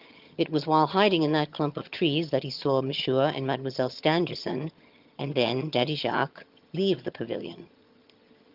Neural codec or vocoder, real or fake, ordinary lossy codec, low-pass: vocoder, 22.05 kHz, 80 mel bands, HiFi-GAN; fake; Opus, 24 kbps; 5.4 kHz